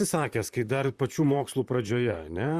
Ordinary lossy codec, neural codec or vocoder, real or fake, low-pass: Opus, 64 kbps; vocoder, 44.1 kHz, 128 mel bands, Pupu-Vocoder; fake; 14.4 kHz